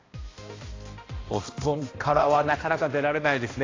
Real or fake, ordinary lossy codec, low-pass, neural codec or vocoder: fake; AAC, 32 kbps; 7.2 kHz; codec, 16 kHz, 1 kbps, X-Codec, HuBERT features, trained on balanced general audio